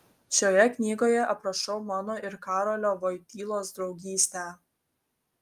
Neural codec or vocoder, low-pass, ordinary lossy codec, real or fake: none; 14.4 kHz; Opus, 32 kbps; real